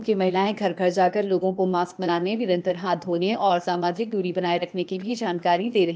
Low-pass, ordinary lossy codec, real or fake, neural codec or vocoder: none; none; fake; codec, 16 kHz, 0.8 kbps, ZipCodec